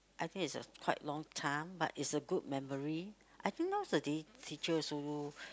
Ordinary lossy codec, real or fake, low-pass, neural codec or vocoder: none; real; none; none